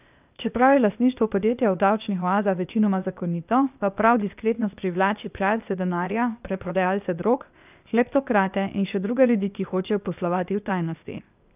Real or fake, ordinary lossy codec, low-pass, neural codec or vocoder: fake; none; 3.6 kHz; codec, 16 kHz, 0.8 kbps, ZipCodec